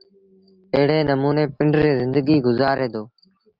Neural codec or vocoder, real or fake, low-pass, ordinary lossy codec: none; real; 5.4 kHz; Opus, 32 kbps